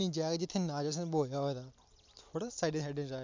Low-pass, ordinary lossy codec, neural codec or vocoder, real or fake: 7.2 kHz; MP3, 64 kbps; none; real